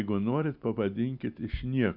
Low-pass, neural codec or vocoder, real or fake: 5.4 kHz; none; real